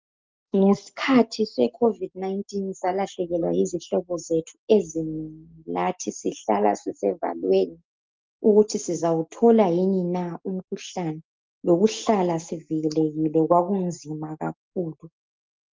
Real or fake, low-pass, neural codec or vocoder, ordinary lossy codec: real; 7.2 kHz; none; Opus, 24 kbps